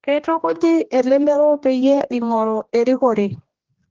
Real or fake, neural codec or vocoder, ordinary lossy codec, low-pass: fake; codec, 16 kHz, 1 kbps, X-Codec, HuBERT features, trained on general audio; Opus, 16 kbps; 7.2 kHz